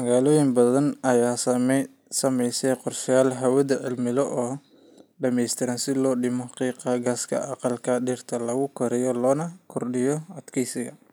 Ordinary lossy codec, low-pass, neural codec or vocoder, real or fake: none; none; none; real